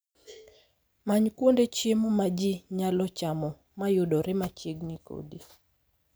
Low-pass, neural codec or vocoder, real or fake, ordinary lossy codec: none; none; real; none